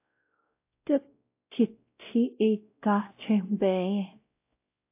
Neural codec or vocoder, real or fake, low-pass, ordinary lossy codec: codec, 16 kHz, 0.5 kbps, X-Codec, WavLM features, trained on Multilingual LibriSpeech; fake; 3.6 kHz; AAC, 32 kbps